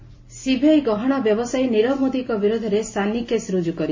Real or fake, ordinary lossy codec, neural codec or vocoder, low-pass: real; MP3, 32 kbps; none; 7.2 kHz